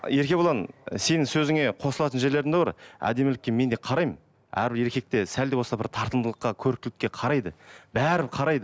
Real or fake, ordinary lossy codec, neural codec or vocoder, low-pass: real; none; none; none